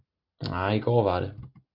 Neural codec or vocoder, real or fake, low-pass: none; real; 5.4 kHz